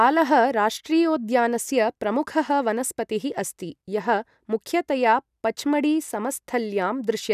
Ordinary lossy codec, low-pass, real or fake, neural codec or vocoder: none; 14.4 kHz; real; none